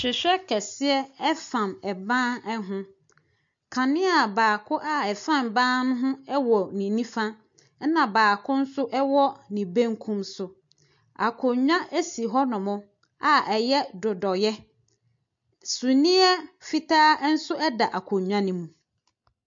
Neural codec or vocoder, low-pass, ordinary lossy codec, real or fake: none; 7.2 kHz; MP3, 48 kbps; real